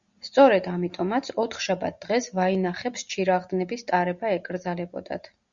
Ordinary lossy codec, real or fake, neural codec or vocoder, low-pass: Opus, 64 kbps; real; none; 7.2 kHz